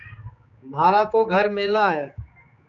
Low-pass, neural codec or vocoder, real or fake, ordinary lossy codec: 7.2 kHz; codec, 16 kHz, 4 kbps, X-Codec, HuBERT features, trained on general audio; fake; MP3, 64 kbps